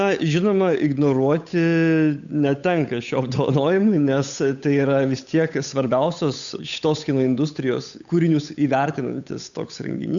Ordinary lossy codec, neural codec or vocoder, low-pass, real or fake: MP3, 96 kbps; codec, 16 kHz, 8 kbps, FunCodec, trained on Chinese and English, 25 frames a second; 7.2 kHz; fake